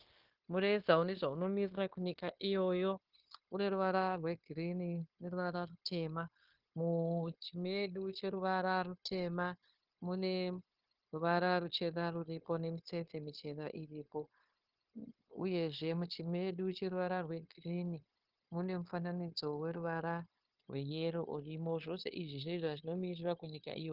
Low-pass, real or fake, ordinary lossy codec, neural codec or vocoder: 5.4 kHz; fake; Opus, 16 kbps; codec, 16 kHz, 0.9 kbps, LongCat-Audio-Codec